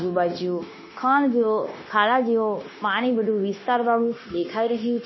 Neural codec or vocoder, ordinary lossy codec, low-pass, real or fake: codec, 16 kHz, 0.9 kbps, LongCat-Audio-Codec; MP3, 24 kbps; 7.2 kHz; fake